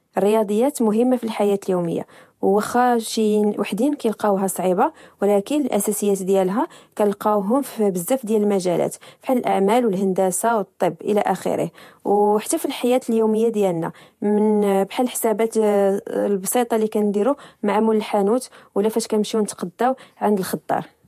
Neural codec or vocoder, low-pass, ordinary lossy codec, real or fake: vocoder, 48 kHz, 128 mel bands, Vocos; 14.4 kHz; MP3, 64 kbps; fake